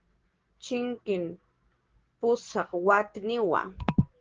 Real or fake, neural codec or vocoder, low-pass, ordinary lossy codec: fake; codec, 16 kHz, 6 kbps, DAC; 7.2 kHz; Opus, 16 kbps